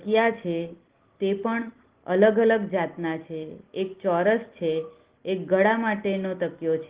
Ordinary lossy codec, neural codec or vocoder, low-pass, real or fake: Opus, 16 kbps; none; 3.6 kHz; real